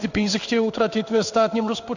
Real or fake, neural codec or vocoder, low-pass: fake; codec, 16 kHz in and 24 kHz out, 1 kbps, XY-Tokenizer; 7.2 kHz